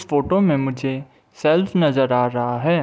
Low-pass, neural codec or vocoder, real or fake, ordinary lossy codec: none; none; real; none